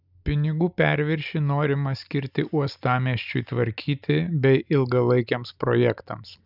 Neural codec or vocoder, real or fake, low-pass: none; real; 5.4 kHz